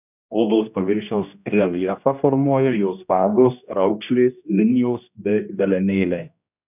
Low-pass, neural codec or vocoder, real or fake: 3.6 kHz; codec, 16 kHz, 1 kbps, X-Codec, HuBERT features, trained on general audio; fake